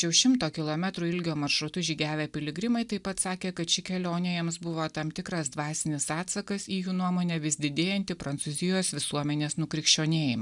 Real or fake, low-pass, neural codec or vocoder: real; 10.8 kHz; none